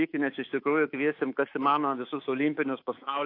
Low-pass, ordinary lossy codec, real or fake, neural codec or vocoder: 5.4 kHz; AAC, 32 kbps; fake; codec, 24 kHz, 3.1 kbps, DualCodec